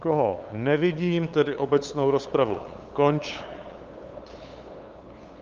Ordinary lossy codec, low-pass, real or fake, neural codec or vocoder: Opus, 32 kbps; 7.2 kHz; fake; codec, 16 kHz, 8 kbps, FunCodec, trained on LibriTTS, 25 frames a second